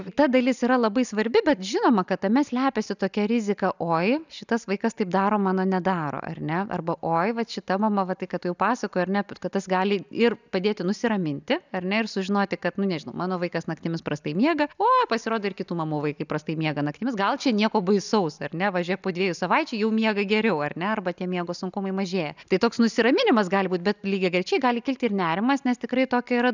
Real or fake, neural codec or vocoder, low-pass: real; none; 7.2 kHz